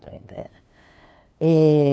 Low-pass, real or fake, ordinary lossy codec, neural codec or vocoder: none; fake; none; codec, 16 kHz, 2 kbps, FunCodec, trained on LibriTTS, 25 frames a second